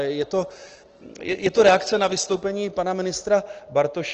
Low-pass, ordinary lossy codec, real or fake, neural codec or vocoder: 7.2 kHz; Opus, 16 kbps; real; none